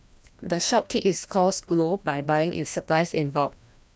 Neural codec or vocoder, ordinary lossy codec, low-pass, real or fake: codec, 16 kHz, 1 kbps, FreqCodec, larger model; none; none; fake